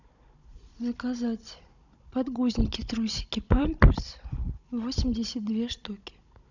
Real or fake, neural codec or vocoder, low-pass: fake; codec, 16 kHz, 16 kbps, FunCodec, trained on Chinese and English, 50 frames a second; 7.2 kHz